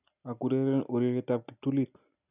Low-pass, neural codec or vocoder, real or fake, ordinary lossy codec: 3.6 kHz; none; real; none